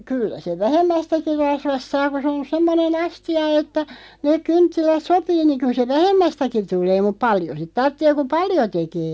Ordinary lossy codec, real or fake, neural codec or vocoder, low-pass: none; real; none; none